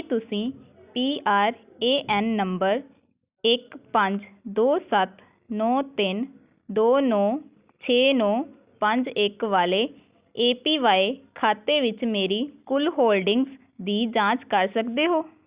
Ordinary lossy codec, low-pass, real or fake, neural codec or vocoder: Opus, 64 kbps; 3.6 kHz; real; none